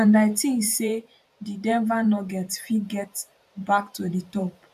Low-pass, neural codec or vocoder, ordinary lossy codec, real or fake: 14.4 kHz; vocoder, 44.1 kHz, 128 mel bands every 512 samples, BigVGAN v2; none; fake